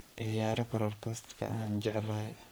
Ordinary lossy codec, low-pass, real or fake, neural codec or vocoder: none; none; fake; codec, 44.1 kHz, 3.4 kbps, Pupu-Codec